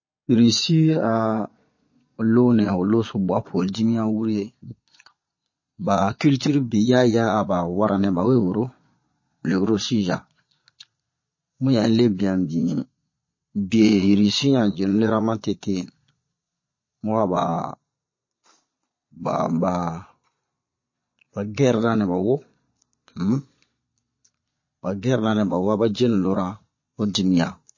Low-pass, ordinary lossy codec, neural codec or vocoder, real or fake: 7.2 kHz; MP3, 32 kbps; vocoder, 22.05 kHz, 80 mel bands, Vocos; fake